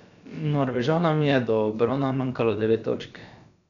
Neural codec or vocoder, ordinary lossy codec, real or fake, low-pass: codec, 16 kHz, about 1 kbps, DyCAST, with the encoder's durations; none; fake; 7.2 kHz